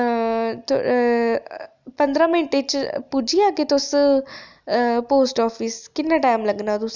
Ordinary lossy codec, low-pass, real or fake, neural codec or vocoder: none; 7.2 kHz; real; none